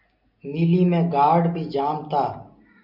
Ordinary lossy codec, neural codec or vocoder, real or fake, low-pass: MP3, 48 kbps; none; real; 5.4 kHz